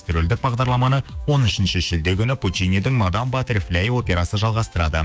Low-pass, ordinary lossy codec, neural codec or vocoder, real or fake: none; none; codec, 16 kHz, 6 kbps, DAC; fake